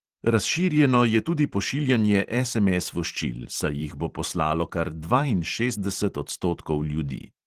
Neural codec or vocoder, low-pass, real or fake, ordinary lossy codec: vocoder, 44.1 kHz, 128 mel bands every 512 samples, BigVGAN v2; 14.4 kHz; fake; Opus, 16 kbps